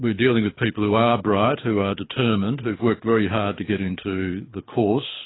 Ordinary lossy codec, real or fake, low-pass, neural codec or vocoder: AAC, 16 kbps; fake; 7.2 kHz; codec, 24 kHz, 6 kbps, HILCodec